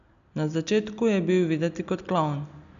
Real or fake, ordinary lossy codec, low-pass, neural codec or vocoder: real; none; 7.2 kHz; none